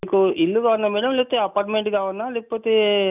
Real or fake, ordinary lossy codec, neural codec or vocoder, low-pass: real; none; none; 3.6 kHz